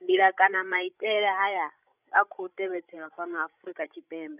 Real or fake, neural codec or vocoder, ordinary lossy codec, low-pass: fake; codec, 16 kHz, 16 kbps, FreqCodec, larger model; none; 3.6 kHz